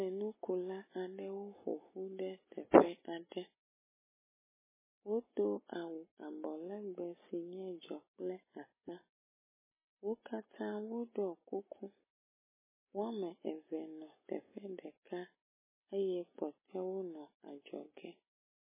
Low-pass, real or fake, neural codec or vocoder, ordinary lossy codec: 3.6 kHz; real; none; MP3, 16 kbps